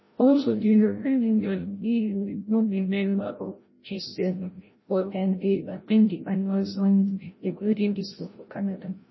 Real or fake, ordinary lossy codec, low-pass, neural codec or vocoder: fake; MP3, 24 kbps; 7.2 kHz; codec, 16 kHz, 0.5 kbps, FreqCodec, larger model